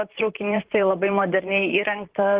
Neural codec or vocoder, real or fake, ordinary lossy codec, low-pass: vocoder, 44.1 kHz, 128 mel bands, Pupu-Vocoder; fake; Opus, 16 kbps; 3.6 kHz